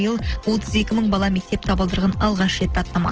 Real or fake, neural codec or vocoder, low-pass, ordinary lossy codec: real; none; 7.2 kHz; Opus, 16 kbps